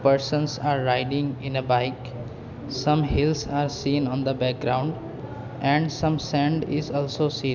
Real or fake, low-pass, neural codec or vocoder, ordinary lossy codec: real; 7.2 kHz; none; none